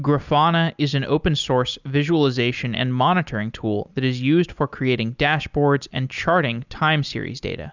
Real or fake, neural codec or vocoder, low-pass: real; none; 7.2 kHz